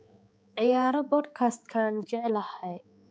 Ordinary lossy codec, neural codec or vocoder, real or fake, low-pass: none; codec, 16 kHz, 4 kbps, X-Codec, HuBERT features, trained on balanced general audio; fake; none